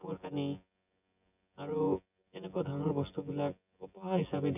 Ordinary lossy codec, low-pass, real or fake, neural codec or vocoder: none; 3.6 kHz; fake; vocoder, 24 kHz, 100 mel bands, Vocos